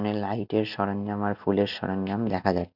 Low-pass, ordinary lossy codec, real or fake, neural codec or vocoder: 5.4 kHz; none; real; none